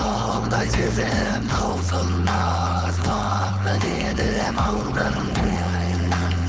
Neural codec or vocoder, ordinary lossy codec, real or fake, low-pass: codec, 16 kHz, 4.8 kbps, FACodec; none; fake; none